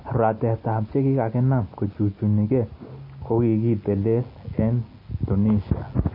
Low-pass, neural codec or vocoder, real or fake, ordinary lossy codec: 5.4 kHz; none; real; MP3, 24 kbps